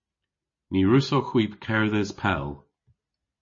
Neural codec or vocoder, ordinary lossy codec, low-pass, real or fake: none; MP3, 32 kbps; 7.2 kHz; real